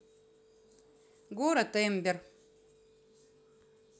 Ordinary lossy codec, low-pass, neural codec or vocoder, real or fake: none; none; none; real